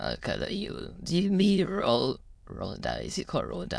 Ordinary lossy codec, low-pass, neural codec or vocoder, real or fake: none; none; autoencoder, 22.05 kHz, a latent of 192 numbers a frame, VITS, trained on many speakers; fake